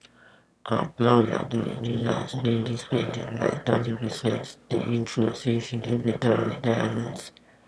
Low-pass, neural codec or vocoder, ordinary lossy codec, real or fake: none; autoencoder, 22.05 kHz, a latent of 192 numbers a frame, VITS, trained on one speaker; none; fake